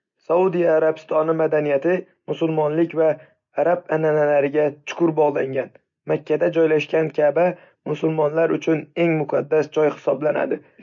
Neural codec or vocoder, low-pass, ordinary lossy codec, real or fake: none; 7.2 kHz; MP3, 48 kbps; real